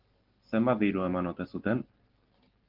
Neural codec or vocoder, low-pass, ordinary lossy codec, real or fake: none; 5.4 kHz; Opus, 16 kbps; real